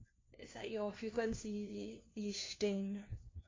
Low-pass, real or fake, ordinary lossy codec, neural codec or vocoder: 7.2 kHz; fake; AAC, 32 kbps; codec, 16 kHz, 1 kbps, FunCodec, trained on LibriTTS, 50 frames a second